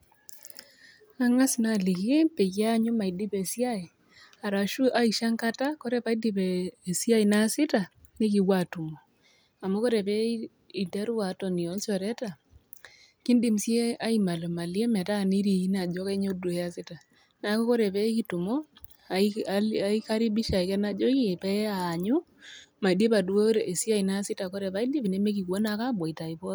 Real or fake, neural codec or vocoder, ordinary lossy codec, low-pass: real; none; none; none